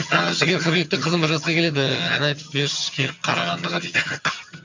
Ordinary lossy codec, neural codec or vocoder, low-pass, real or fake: MP3, 64 kbps; vocoder, 22.05 kHz, 80 mel bands, HiFi-GAN; 7.2 kHz; fake